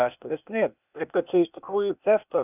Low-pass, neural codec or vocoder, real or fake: 3.6 kHz; codec, 16 kHz, 0.8 kbps, ZipCodec; fake